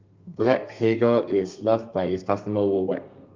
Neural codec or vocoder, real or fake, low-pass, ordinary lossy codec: codec, 32 kHz, 1.9 kbps, SNAC; fake; 7.2 kHz; Opus, 32 kbps